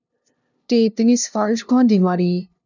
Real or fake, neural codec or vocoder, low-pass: fake; codec, 16 kHz, 0.5 kbps, FunCodec, trained on LibriTTS, 25 frames a second; 7.2 kHz